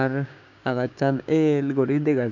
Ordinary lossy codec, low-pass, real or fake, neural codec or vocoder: none; 7.2 kHz; fake; codec, 16 kHz, 6 kbps, DAC